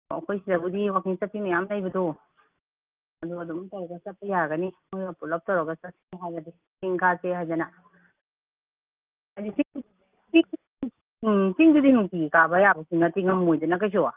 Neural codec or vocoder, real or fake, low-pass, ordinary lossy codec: none; real; 3.6 kHz; Opus, 32 kbps